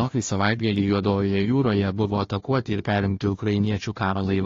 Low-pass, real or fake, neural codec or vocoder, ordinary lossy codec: 7.2 kHz; fake; codec, 16 kHz, 1 kbps, FunCodec, trained on Chinese and English, 50 frames a second; AAC, 32 kbps